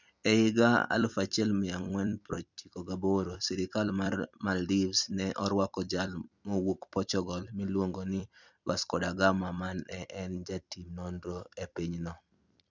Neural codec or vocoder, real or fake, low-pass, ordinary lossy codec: vocoder, 44.1 kHz, 128 mel bands every 256 samples, BigVGAN v2; fake; 7.2 kHz; none